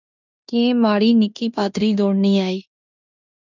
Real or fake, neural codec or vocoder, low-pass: fake; codec, 16 kHz in and 24 kHz out, 0.9 kbps, LongCat-Audio-Codec, fine tuned four codebook decoder; 7.2 kHz